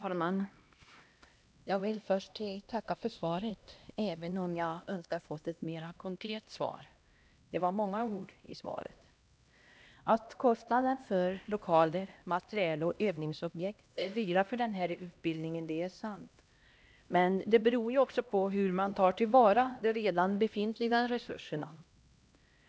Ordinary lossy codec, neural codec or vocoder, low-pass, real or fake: none; codec, 16 kHz, 1 kbps, X-Codec, HuBERT features, trained on LibriSpeech; none; fake